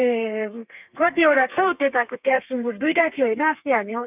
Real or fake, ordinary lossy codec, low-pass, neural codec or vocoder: fake; none; 3.6 kHz; codec, 32 kHz, 1.9 kbps, SNAC